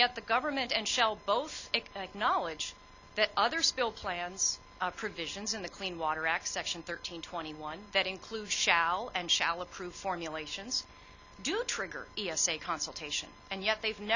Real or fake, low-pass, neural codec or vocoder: real; 7.2 kHz; none